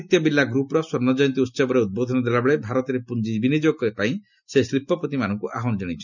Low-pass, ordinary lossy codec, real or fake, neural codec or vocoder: 7.2 kHz; none; real; none